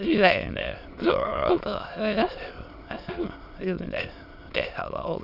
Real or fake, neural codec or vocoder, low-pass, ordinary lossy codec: fake; autoencoder, 22.05 kHz, a latent of 192 numbers a frame, VITS, trained on many speakers; 5.4 kHz; none